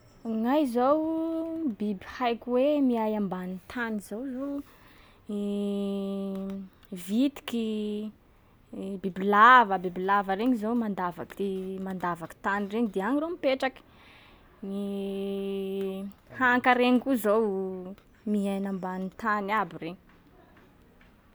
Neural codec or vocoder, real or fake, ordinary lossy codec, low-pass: none; real; none; none